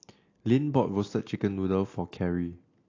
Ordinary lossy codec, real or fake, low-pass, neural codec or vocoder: AAC, 32 kbps; real; 7.2 kHz; none